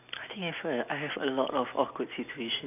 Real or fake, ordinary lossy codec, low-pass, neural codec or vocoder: real; none; 3.6 kHz; none